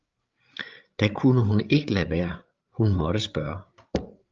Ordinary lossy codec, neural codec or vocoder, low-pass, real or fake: Opus, 24 kbps; codec, 16 kHz, 8 kbps, FreqCodec, larger model; 7.2 kHz; fake